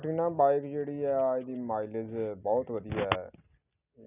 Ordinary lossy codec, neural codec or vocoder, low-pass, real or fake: none; none; 3.6 kHz; real